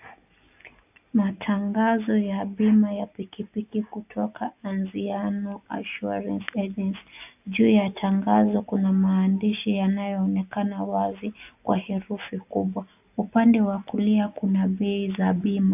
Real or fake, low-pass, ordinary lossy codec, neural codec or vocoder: real; 3.6 kHz; AAC, 32 kbps; none